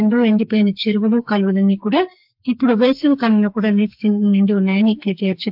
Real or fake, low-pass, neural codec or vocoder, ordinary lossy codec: fake; 5.4 kHz; codec, 44.1 kHz, 2.6 kbps, SNAC; AAC, 48 kbps